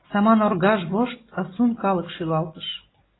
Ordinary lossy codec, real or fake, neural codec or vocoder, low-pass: AAC, 16 kbps; real; none; 7.2 kHz